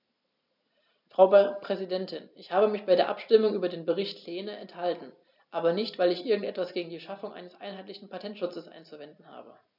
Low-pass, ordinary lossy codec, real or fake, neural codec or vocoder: 5.4 kHz; none; real; none